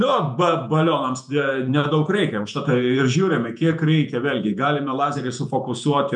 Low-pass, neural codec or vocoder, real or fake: 10.8 kHz; vocoder, 48 kHz, 128 mel bands, Vocos; fake